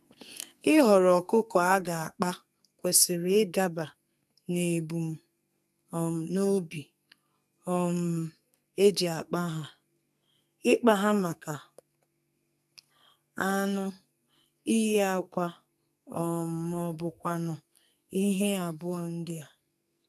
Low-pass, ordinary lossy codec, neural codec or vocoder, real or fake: 14.4 kHz; none; codec, 44.1 kHz, 2.6 kbps, SNAC; fake